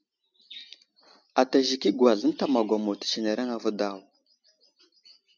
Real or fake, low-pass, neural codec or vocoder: real; 7.2 kHz; none